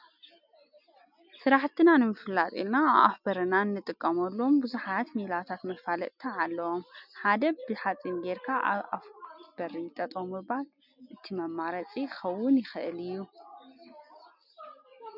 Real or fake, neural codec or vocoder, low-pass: real; none; 5.4 kHz